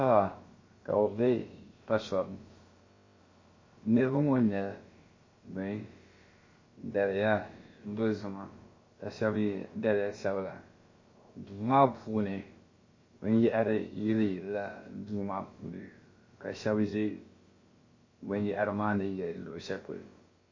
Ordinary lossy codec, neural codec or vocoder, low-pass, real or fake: MP3, 32 kbps; codec, 16 kHz, about 1 kbps, DyCAST, with the encoder's durations; 7.2 kHz; fake